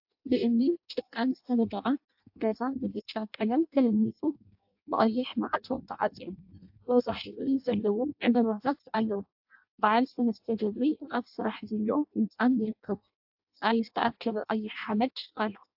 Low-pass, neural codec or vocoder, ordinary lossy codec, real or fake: 5.4 kHz; codec, 16 kHz in and 24 kHz out, 0.6 kbps, FireRedTTS-2 codec; AAC, 48 kbps; fake